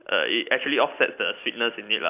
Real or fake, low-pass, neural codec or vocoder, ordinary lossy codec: real; 3.6 kHz; none; none